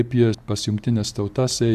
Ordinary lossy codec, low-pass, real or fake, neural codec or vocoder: AAC, 96 kbps; 14.4 kHz; real; none